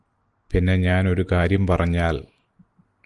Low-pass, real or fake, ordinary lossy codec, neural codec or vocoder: 10.8 kHz; real; Opus, 24 kbps; none